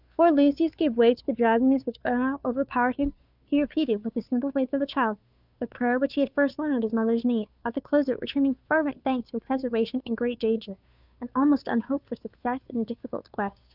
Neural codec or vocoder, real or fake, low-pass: codec, 16 kHz, 2 kbps, FunCodec, trained on Chinese and English, 25 frames a second; fake; 5.4 kHz